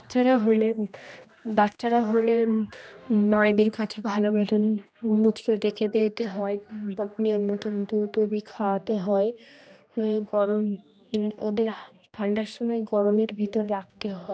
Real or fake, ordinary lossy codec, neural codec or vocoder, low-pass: fake; none; codec, 16 kHz, 1 kbps, X-Codec, HuBERT features, trained on general audio; none